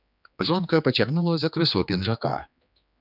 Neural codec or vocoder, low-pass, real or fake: codec, 16 kHz, 2 kbps, X-Codec, HuBERT features, trained on general audio; 5.4 kHz; fake